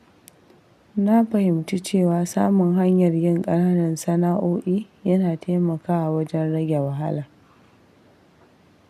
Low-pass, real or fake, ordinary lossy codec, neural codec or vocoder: 14.4 kHz; real; none; none